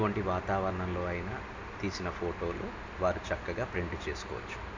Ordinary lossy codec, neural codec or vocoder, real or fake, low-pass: MP3, 64 kbps; none; real; 7.2 kHz